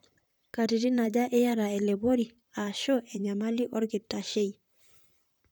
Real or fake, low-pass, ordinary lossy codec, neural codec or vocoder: fake; none; none; vocoder, 44.1 kHz, 128 mel bands, Pupu-Vocoder